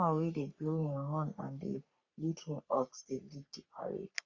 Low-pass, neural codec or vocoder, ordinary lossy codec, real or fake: 7.2 kHz; codec, 44.1 kHz, 3.4 kbps, Pupu-Codec; Opus, 64 kbps; fake